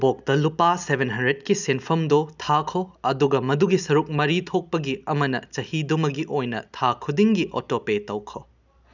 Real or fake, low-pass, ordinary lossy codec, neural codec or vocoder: real; 7.2 kHz; none; none